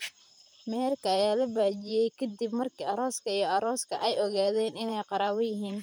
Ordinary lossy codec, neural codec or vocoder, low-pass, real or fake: none; vocoder, 44.1 kHz, 128 mel bands, Pupu-Vocoder; none; fake